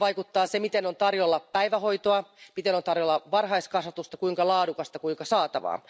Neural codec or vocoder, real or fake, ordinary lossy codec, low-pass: none; real; none; none